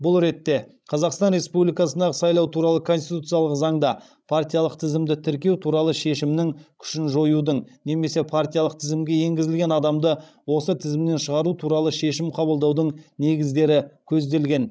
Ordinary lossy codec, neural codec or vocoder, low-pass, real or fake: none; codec, 16 kHz, 16 kbps, FreqCodec, larger model; none; fake